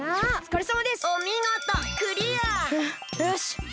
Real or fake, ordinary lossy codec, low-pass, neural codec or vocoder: real; none; none; none